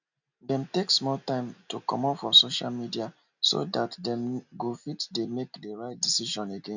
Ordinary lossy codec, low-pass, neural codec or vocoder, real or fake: none; 7.2 kHz; none; real